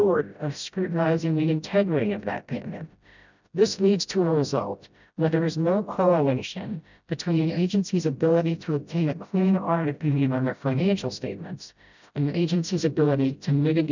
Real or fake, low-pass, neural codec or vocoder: fake; 7.2 kHz; codec, 16 kHz, 0.5 kbps, FreqCodec, smaller model